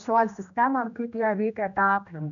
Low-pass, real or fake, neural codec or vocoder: 7.2 kHz; fake; codec, 16 kHz, 1 kbps, X-Codec, HuBERT features, trained on general audio